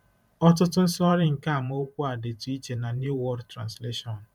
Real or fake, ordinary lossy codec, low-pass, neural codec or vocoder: fake; none; 19.8 kHz; vocoder, 44.1 kHz, 128 mel bands every 512 samples, BigVGAN v2